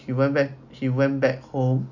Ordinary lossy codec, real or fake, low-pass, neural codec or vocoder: none; real; 7.2 kHz; none